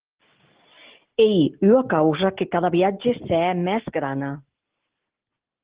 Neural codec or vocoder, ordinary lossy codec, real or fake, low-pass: none; Opus, 16 kbps; real; 3.6 kHz